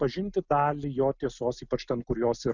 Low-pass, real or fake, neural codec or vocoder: 7.2 kHz; real; none